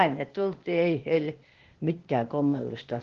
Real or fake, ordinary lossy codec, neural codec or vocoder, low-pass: fake; Opus, 16 kbps; codec, 16 kHz, 0.8 kbps, ZipCodec; 7.2 kHz